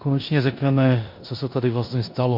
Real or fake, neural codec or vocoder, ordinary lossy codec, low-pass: fake; codec, 16 kHz in and 24 kHz out, 0.9 kbps, LongCat-Audio-Codec, four codebook decoder; MP3, 32 kbps; 5.4 kHz